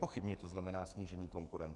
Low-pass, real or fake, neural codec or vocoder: 10.8 kHz; fake; codec, 44.1 kHz, 2.6 kbps, SNAC